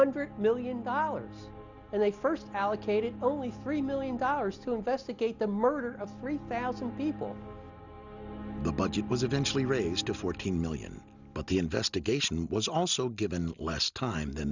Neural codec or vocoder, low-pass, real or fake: none; 7.2 kHz; real